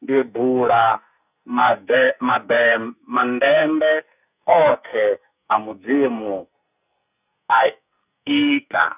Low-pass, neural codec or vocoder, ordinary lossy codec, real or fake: 3.6 kHz; codec, 44.1 kHz, 2.6 kbps, SNAC; none; fake